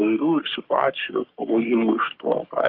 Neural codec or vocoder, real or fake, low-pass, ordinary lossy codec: codec, 44.1 kHz, 3.4 kbps, Pupu-Codec; fake; 5.4 kHz; Opus, 32 kbps